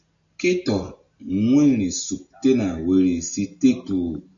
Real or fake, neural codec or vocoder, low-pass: real; none; 7.2 kHz